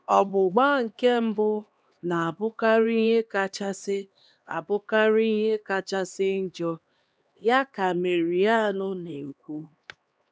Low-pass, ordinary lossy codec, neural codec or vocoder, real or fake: none; none; codec, 16 kHz, 2 kbps, X-Codec, HuBERT features, trained on LibriSpeech; fake